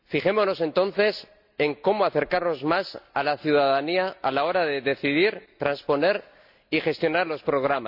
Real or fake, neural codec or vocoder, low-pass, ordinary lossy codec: real; none; 5.4 kHz; none